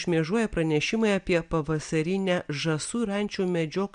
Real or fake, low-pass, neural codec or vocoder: real; 9.9 kHz; none